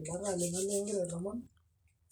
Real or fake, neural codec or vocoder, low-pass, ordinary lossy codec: real; none; none; none